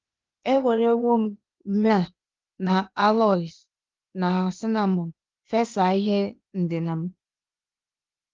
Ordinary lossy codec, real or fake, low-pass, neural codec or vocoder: Opus, 32 kbps; fake; 7.2 kHz; codec, 16 kHz, 0.8 kbps, ZipCodec